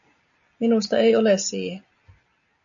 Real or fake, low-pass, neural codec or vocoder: real; 7.2 kHz; none